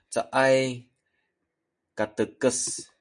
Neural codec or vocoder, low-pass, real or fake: none; 9.9 kHz; real